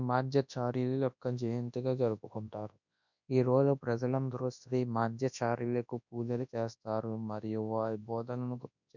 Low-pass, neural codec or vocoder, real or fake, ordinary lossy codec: 7.2 kHz; codec, 24 kHz, 0.9 kbps, WavTokenizer, large speech release; fake; none